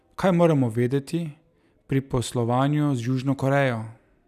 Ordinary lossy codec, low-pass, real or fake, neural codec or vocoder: none; 14.4 kHz; real; none